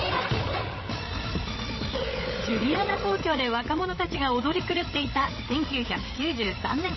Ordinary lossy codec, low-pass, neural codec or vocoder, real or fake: MP3, 24 kbps; 7.2 kHz; codec, 16 kHz, 8 kbps, FreqCodec, larger model; fake